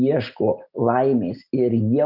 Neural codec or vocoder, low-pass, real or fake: none; 5.4 kHz; real